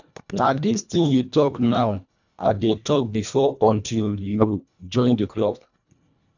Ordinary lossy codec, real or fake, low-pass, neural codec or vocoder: none; fake; 7.2 kHz; codec, 24 kHz, 1.5 kbps, HILCodec